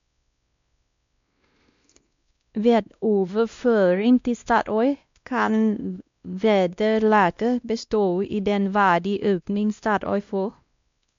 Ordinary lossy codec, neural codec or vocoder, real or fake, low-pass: MP3, 64 kbps; codec, 16 kHz, 1 kbps, X-Codec, WavLM features, trained on Multilingual LibriSpeech; fake; 7.2 kHz